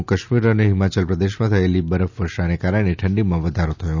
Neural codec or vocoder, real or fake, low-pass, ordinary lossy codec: none; real; 7.2 kHz; none